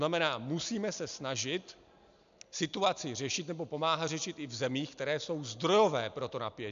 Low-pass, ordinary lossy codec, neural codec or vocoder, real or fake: 7.2 kHz; MP3, 64 kbps; none; real